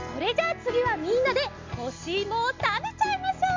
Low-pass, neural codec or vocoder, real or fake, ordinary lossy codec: 7.2 kHz; none; real; MP3, 64 kbps